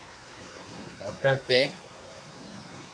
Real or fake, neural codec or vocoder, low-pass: fake; codec, 24 kHz, 1 kbps, SNAC; 9.9 kHz